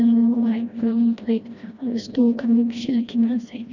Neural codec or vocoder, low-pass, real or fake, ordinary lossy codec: codec, 16 kHz, 1 kbps, FreqCodec, smaller model; 7.2 kHz; fake; none